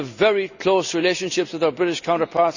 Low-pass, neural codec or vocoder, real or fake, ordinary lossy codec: 7.2 kHz; none; real; none